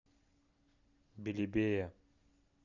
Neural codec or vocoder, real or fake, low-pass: none; real; 7.2 kHz